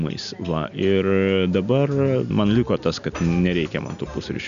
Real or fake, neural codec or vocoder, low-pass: real; none; 7.2 kHz